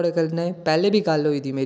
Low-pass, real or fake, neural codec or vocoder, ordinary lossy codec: none; real; none; none